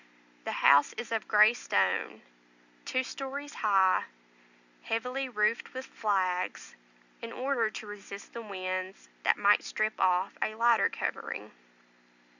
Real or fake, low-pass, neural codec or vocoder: real; 7.2 kHz; none